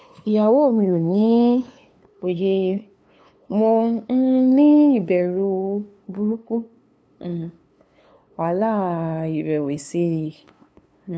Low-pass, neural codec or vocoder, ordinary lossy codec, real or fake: none; codec, 16 kHz, 2 kbps, FunCodec, trained on LibriTTS, 25 frames a second; none; fake